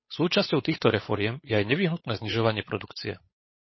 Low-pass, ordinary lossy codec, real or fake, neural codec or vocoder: 7.2 kHz; MP3, 24 kbps; fake; codec, 16 kHz, 8 kbps, FunCodec, trained on Chinese and English, 25 frames a second